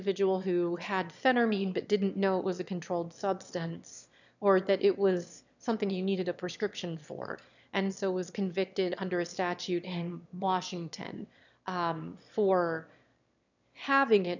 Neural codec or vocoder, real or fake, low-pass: autoencoder, 22.05 kHz, a latent of 192 numbers a frame, VITS, trained on one speaker; fake; 7.2 kHz